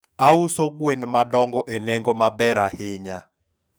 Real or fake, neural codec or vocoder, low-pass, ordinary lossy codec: fake; codec, 44.1 kHz, 2.6 kbps, SNAC; none; none